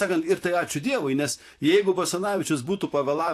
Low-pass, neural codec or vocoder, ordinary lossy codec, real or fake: 14.4 kHz; vocoder, 44.1 kHz, 128 mel bands, Pupu-Vocoder; AAC, 64 kbps; fake